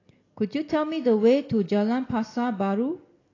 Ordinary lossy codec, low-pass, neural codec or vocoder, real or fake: AAC, 32 kbps; 7.2 kHz; none; real